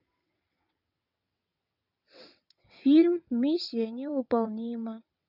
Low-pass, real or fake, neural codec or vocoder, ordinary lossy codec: 5.4 kHz; fake; vocoder, 22.05 kHz, 80 mel bands, WaveNeXt; none